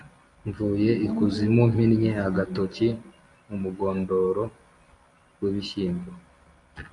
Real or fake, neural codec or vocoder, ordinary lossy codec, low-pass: real; none; AAC, 48 kbps; 10.8 kHz